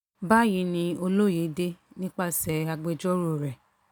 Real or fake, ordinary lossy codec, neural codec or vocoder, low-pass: real; none; none; 19.8 kHz